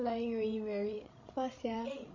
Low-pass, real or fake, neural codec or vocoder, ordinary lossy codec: 7.2 kHz; fake; codec, 16 kHz, 16 kbps, FreqCodec, larger model; MP3, 32 kbps